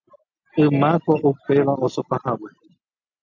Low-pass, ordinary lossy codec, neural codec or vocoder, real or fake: 7.2 kHz; AAC, 48 kbps; none; real